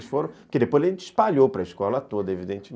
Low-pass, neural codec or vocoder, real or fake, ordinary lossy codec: none; none; real; none